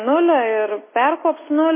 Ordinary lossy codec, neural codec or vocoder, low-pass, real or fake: MP3, 16 kbps; none; 3.6 kHz; real